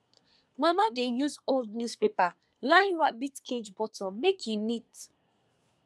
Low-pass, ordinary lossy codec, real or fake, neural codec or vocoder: none; none; fake; codec, 24 kHz, 1 kbps, SNAC